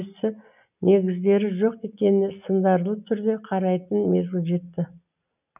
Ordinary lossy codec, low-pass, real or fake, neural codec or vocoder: none; 3.6 kHz; real; none